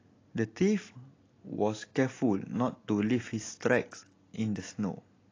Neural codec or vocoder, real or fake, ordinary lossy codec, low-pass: none; real; AAC, 32 kbps; 7.2 kHz